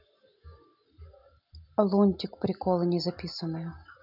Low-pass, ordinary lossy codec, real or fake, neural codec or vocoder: 5.4 kHz; none; real; none